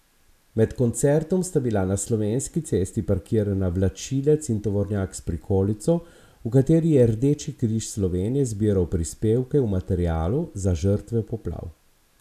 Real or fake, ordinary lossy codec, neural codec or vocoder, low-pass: real; none; none; 14.4 kHz